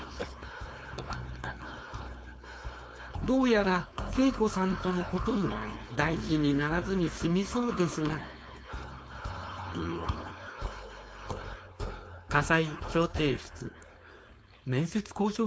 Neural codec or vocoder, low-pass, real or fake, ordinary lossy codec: codec, 16 kHz, 4.8 kbps, FACodec; none; fake; none